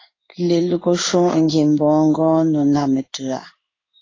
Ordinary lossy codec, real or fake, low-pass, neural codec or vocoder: AAC, 32 kbps; fake; 7.2 kHz; codec, 16 kHz in and 24 kHz out, 1 kbps, XY-Tokenizer